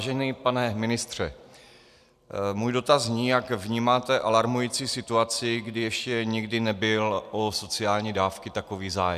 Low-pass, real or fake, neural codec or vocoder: 14.4 kHz; real; none